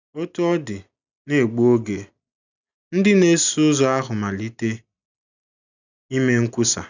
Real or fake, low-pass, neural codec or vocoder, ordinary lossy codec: real; 7.2 kHz; none; none